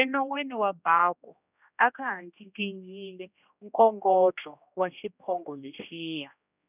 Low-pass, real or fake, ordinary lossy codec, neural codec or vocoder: 3.6 kHz; fake; none; codec, 16 kHz, 1 kbps, X-Codec, HuBERT features, trained on general audio